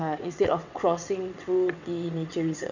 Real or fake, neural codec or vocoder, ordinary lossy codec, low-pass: fake; vocoder, 22.05 kHz, 80 mel bands, WaveNeXt; none; 7.2 kHz